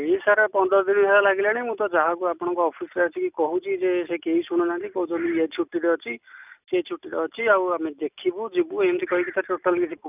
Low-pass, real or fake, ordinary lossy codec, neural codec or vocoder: 3.6 kHz; real; none; none